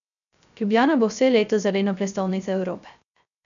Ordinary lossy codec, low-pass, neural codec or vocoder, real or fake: none; 7.2 kHz; codec, 16 kHz, 0.3 kbps, FocalCodec; fake